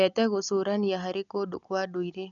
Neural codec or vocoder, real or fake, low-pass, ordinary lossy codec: none; real; 7.2 kHz; none